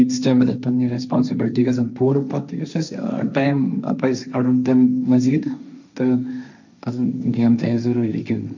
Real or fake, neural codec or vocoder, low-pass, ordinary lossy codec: fake; codec, 16 kHz, 1.1 kbps, Voila-Tokenizer; 7.2 kHz; none